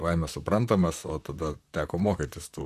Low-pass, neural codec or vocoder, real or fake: 14.4 kHz; vocoder, 44.1 kHz, 128 mel bands, Pupu-Vocoder; fake